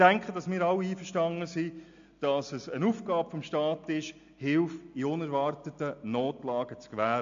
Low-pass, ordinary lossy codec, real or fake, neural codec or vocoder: 7.2 kHz; AAC, 64 kbps; real; none